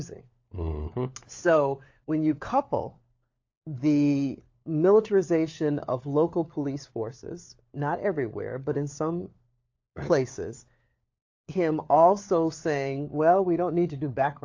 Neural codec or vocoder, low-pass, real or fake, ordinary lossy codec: codec, 16 kHz, 4 kbps, FunCodec, trained on LibriTTS, 50 frames a second; 7.2 kHz; fake; AAC, 48 kbps